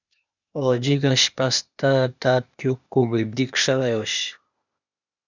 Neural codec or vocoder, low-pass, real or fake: codec, 16 kHz, 0.8 kbps, ZipCodec; 7.2 kHz; fake